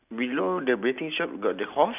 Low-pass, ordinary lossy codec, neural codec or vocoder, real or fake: 3.6 kHz; none; none; real